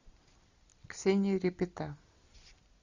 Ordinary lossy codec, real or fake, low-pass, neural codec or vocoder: Opus, 64 kbps; real; 7.2 kHz; none